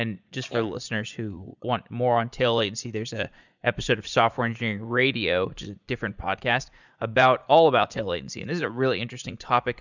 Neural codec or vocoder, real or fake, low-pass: vocoder, 44.1 kHz, 80 mel bands, Vocos; fake; 7.2 kHz